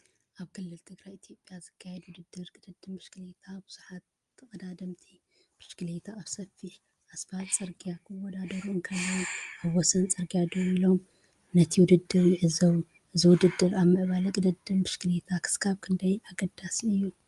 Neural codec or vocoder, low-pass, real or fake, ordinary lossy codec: none; 10.8 kHz; real; Opus, 32 kbps